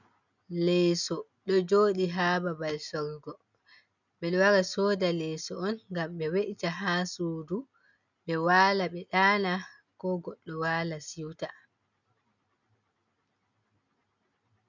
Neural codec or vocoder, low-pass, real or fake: none; 7.2 kHz; real